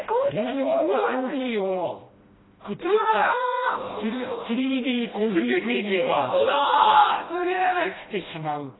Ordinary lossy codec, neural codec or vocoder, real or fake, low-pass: AAC, 16 kbps; codec, 16 kHz, 1 kbps, FreqCodec, smaller model; fake; 7.2 kHz